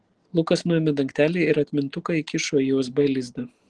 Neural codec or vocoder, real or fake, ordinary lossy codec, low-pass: none; real; Opus, 16 kbps; 9.9 kHz